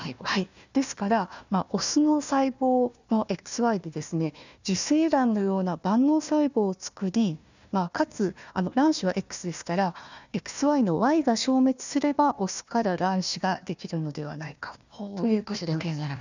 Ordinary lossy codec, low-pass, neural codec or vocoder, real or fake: none; 7.2 kHz; codec, 16 kHz, 1 kbps, FunCodec, trained on Chinese and English, 50 frames a second; fake